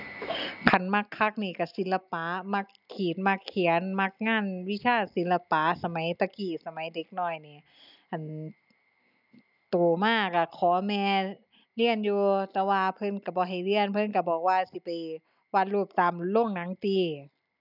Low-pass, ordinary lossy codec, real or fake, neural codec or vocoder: 5.4 kHz; none; real; none